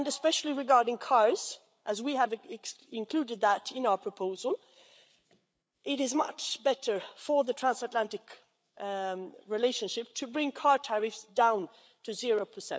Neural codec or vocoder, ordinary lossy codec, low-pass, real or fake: codec, 16 kHz, 8 kbps, FreqCodec, larger model; none; none; fake